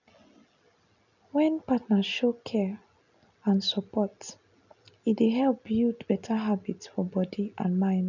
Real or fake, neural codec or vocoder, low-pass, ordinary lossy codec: real; none; 7.2 kHz; none